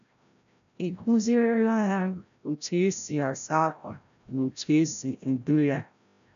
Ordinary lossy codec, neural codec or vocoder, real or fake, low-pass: none; codec, 16 kHz, 0.5 kbps, FreqCodec, larger model; fake; 7.2 kHz